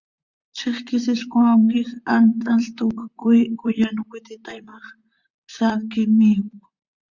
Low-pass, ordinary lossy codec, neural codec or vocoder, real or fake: 7.2 kHz; Opus, 64 kbps; vocoder, 44.1 kHz, 128 mel bands, Pupu-Vocoder; fake